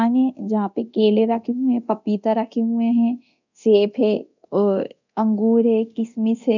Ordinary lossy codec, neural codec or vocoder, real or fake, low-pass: none; codec, 24 kHz, 0.9 kbps, DualCodec; fake; 7.2 kHz